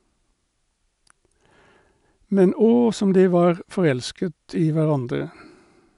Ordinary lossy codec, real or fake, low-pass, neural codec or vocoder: none; real; 10.8 kHz; none